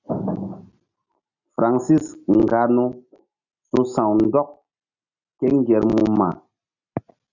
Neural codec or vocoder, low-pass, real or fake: none; 7.2 kHz; real